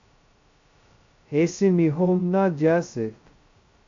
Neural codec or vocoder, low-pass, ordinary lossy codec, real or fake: codec, 16 kHz, 0.2 kbps, FocalCodec; 7.2 kHz; AAC, 48 kbps; fake